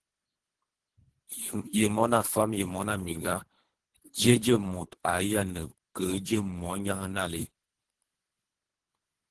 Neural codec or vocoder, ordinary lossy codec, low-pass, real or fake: codec, 24 kHz, 3 kbps, HILCodec; Opus, 16 kbps; 10.8 kHz; fake